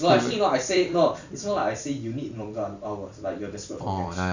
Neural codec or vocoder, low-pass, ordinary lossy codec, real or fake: vocoder, 44.1 kHz, 128 mel bands every 256 samples, BigVGAN v2; 7.2 kHz; none; fake